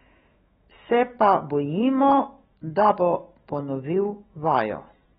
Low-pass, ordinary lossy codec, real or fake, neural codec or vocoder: 19.8 kHz; AAC, 16 kbps; fake; autoencoder, 48 kHz, 128 numbers a frame, DAC-VAE, trained on Japanese speech